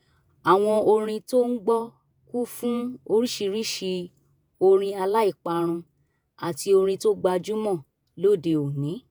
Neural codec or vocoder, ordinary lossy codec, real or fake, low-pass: vocoder, 48 kHz, 128 mel bands, Vocos; none; fake; none